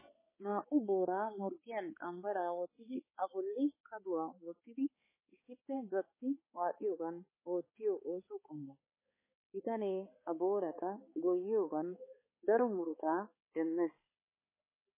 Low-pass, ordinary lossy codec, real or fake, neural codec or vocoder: 3.6 kHz; MP3, 16 kbps; fake; codec, 16 kHz, 4 kbps, X-Codec, HuBERT features, trained on balanced general audio